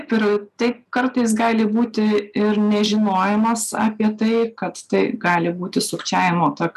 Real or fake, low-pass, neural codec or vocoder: real; 14.4 kHz; none